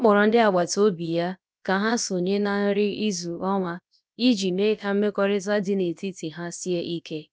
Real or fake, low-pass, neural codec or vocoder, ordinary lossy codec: fake; none; codec, 16 kHz, about 1 kbps, DyCAST, with the encoder's durations; none